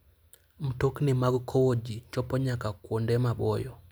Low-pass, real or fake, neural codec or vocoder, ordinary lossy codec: none; real; none; none